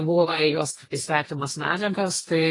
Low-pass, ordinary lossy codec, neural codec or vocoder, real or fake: 10.8 kHz; AAC, 32 kbps; codec, 32 kHz, 1.9 kbps, SNAC; fake